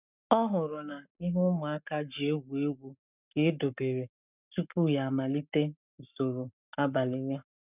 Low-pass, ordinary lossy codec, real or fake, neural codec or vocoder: 3.6 kHz; none; fake; codec, 44.1 kHz, 7.8 kbps, Pupu-Codec